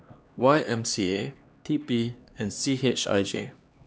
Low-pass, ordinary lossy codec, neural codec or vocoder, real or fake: none; none; codec, 16 kHz, 2 kbps, X-Codec, HuBERT features, trained on LibriSpeech; fake